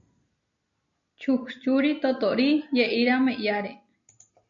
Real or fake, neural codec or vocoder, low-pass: real; none; 7.2 kHz